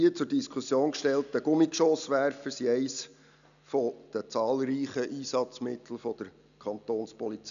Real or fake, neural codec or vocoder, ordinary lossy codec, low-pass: real; none; none; 7.2 kHz